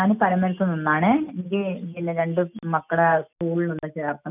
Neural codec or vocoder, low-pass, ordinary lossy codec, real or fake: none; 3.6 kHz; none; real